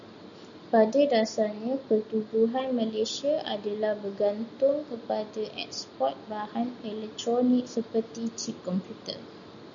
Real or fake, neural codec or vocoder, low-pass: real; none; 7.2 kHz